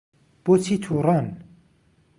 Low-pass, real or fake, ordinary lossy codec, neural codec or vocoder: 10.8 kHz; real; Opus, 64 kbps; none